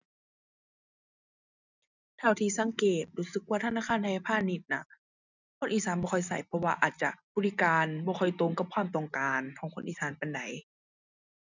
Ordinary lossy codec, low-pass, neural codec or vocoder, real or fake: none; 7.2 kHz; none; real